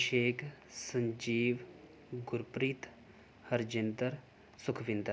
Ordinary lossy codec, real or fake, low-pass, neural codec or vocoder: none; real; none; none